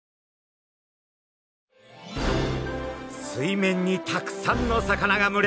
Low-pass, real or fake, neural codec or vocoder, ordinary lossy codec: none; real; none; none